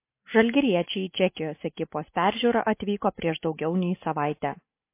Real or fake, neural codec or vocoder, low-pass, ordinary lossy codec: real; none; 3.6 kHz; MP3, 24 kbps